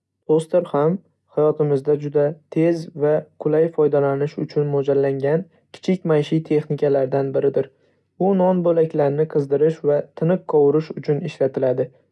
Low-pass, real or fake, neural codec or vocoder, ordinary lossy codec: none; real; none; none